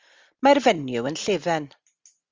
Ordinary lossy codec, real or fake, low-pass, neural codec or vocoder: Opus, 32 kbps; real; 7.2 kHz; none